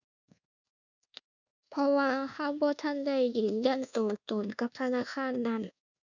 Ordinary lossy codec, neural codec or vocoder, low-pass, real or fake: none; codec, 24 kHz, 1.2 kbps, DualCodec; 7.2 kHz; fake